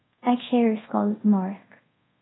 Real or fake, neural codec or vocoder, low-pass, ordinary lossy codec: fake; codec, 24 kHz, 0.5 kbps, DualCodec; 7.2 kHz; AAC, 16 kbps